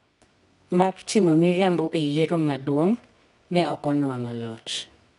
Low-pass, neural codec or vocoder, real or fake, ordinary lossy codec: 10.8 kHz; codec, 24 kHz, 0.9 kbps, WavTokenizer, medium music audio release; fake; none